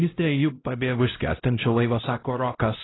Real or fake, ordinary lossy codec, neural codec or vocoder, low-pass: fake; AAC, 16 kbps; codec, 16 kHz, 0.5 kbps, X-Codec, HuBERT features, trained on LibriSpeech; 7.2 kHz